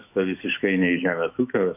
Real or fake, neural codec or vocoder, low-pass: fake; autoencoder, 48 kHz, 128 numbers a frame, DAC-VAE, trained on Japanese speech; 3.6 kHz